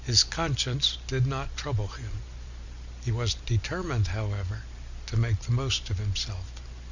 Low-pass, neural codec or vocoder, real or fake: 7.2 kHz; none; real